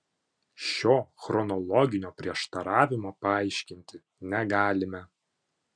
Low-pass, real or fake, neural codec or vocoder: 9.9 kHz; real; none